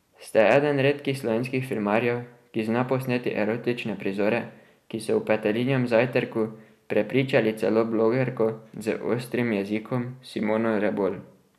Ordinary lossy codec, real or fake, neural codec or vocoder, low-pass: none; real; none; 14.4 kHz